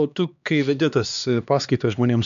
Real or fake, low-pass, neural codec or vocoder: fake; 7.2 kHz; codec, 16 kHz, 2 kbps, X-Codec, HuBERT features, trained on LibriSpeech